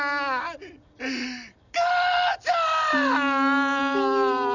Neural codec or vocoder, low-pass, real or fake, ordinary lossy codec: none; 7.2 kHz; real; none